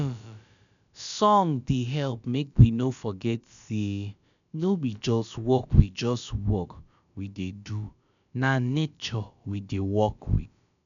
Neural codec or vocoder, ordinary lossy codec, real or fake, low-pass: codec, 16 kHz, about 1 kbps, DyCAST, with the encoder's durations; none; fake; 7.2 kHz